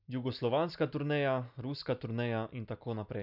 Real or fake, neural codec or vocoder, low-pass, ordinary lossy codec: real; none; 5.4 kHz; none